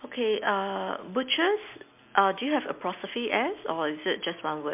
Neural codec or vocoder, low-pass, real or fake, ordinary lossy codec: none; 3.6 kHz; real; MP3, 32 kbps